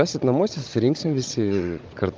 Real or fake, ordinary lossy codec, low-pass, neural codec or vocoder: fake; Opus, 24 kbps; 7.2 kHz; codec, 16 kHz, 16 kbps, FunCodec, trained on Chinese and English, 50 frames a second